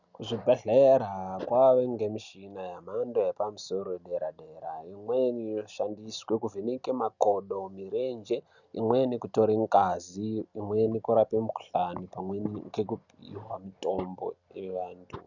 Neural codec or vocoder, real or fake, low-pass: none; real; 7.2 kHz